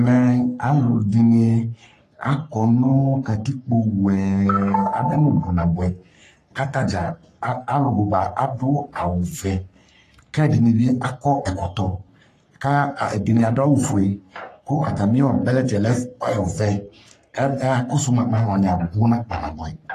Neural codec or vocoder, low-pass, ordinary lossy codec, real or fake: codec, 44.1 kHz, 3.4 kbps, Pupu-Codec; 14.4 kHz; AAC, 48 kbps; fake